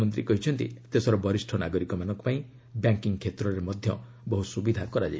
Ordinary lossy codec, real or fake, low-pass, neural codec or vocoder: none; real; none; none